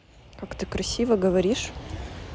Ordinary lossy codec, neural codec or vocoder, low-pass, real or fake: none; none; none; real